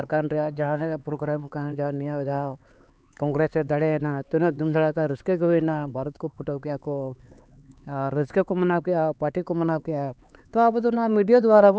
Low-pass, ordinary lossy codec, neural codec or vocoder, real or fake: none; none; codec, 16 kHz, 4 kbps, X-Codec, HuBERT features, trained on LibriSpeech; fake